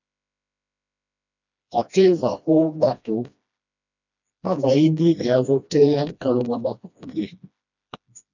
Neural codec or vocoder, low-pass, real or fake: codec, 16 kHz, 1 kbps, FreqCodec, smaller model; 7.2 kHz; fake